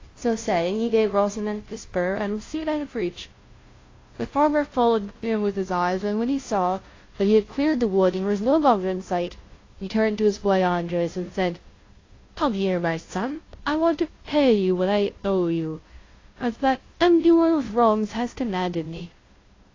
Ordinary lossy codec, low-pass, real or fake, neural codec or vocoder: AAC, 32 kbps; 7.2 kHz; fake; codec, 16 kHz, 0.5 kbps, FunCodec, trained on Chinese and English, 25 frames a second